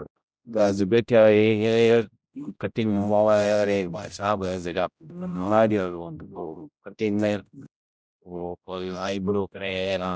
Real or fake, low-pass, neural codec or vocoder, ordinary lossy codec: fake; none; codec, 16 kHz, 0.5 kbps, X-Codec, HuBERT features, trained on general audio; none